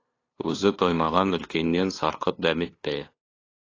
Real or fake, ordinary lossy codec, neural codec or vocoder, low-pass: fake; AAC, 32 kbps; codec, 16 kHz, 2 kbps, FunCodec, trained on LibriTTS, 25 frames a second; 7.2 kHz